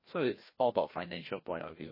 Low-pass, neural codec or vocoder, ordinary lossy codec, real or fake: 5.4 kHz; codec, 16 kHz, 1 kbps, FreqCodec, larger model; MP3, 24 kbps; fake